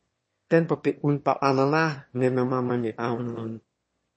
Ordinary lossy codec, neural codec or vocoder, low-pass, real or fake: MP3, 32 kbps; autoencoder, 22.05 kHz, a latent of 192 numbers a frame, VITS, trained on one speaker; 9.9 kHz; fake